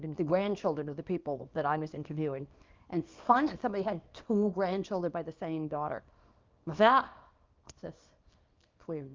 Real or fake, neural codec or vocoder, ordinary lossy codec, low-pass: fake; codec, 24 kHz, 0.9 kbps, WavTokenizer, small release; Opus, 32 kbps; 7.2 kHz